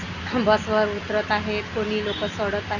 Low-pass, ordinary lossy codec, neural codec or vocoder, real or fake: 7.2 kHz; none; none; real